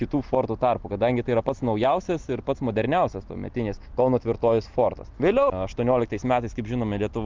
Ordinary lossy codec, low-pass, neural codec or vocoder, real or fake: Opus, 32 kbps; 7.2 kHz; none; real